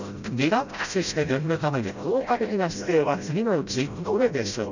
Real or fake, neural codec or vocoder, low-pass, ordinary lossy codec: fake; codec, 16 kHz, 0.5 kbps, FreqCodec, smaller model; 7.2 kHz; none